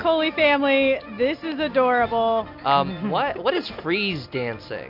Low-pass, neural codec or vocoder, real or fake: 5.4 kHz; none; real